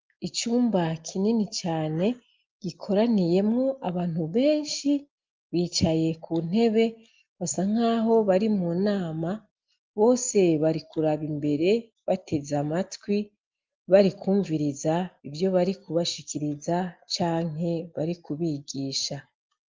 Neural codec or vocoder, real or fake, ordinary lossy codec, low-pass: none; real; Opus, 32 kbps; 7.2 kHz